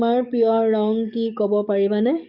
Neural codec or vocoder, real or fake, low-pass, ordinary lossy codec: codec, 44.1 kHz, 7.8 kbps, DAC; fake; 5.4 kHz; MP3, 48 kbps